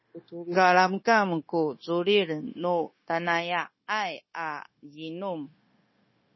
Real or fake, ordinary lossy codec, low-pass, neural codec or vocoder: fake; MP3, 24 kbps; 7.2 kHz; codec, 16 kHz, 0.9 kbps, LongCat-Audio-Codec